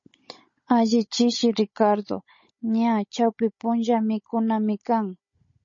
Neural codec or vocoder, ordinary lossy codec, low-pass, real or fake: codec, 16 kHz, 16 kbps, FunCodec, trained on Chinese and English, 50 frames a second; MP3, 32 kbps; 7.2 kHz; fake